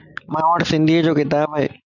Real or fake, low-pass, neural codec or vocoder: real; 7.2 kHz; none